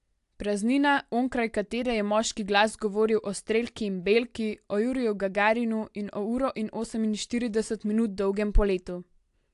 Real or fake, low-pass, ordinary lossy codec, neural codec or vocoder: real; 10.8 kHz; AAC, 64 kbps; none